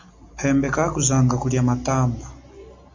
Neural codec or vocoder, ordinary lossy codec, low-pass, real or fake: none; MP3, 48 kbps; 7.2 kHz; real